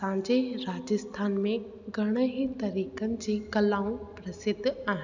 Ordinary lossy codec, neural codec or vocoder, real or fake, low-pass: none; none; real; 7.2 kHz